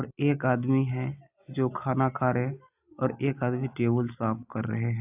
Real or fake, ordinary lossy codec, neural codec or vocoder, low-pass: real; AAC, 32 kbps; none; 3.6 kHz